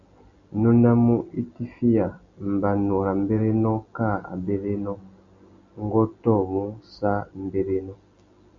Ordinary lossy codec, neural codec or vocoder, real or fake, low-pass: Opus, 64 kbps; none; real; 7.2 kHz